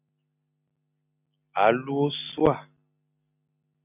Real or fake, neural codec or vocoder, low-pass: real; none; 3.6 kHz